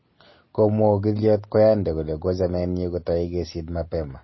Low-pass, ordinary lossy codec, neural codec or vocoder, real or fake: 7.2 kHz; MP3, 24 kbps; none; real